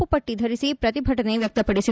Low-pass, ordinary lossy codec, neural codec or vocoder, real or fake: none; none; none; real